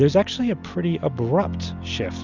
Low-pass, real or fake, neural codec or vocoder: 7.2 kHz; real; none